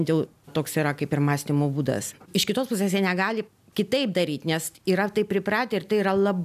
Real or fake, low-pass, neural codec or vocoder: real; 14.4 kHz; none